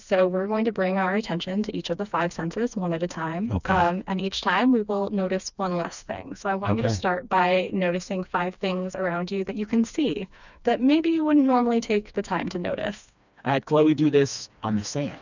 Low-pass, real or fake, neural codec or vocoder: 7.2 kHz; fake; codec, 16 kHz, 2 kbps, FreqCodec, smaller model